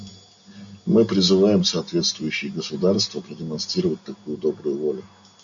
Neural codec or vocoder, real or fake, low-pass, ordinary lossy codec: none; real; 7.2 kHz; AAC, 64 kbps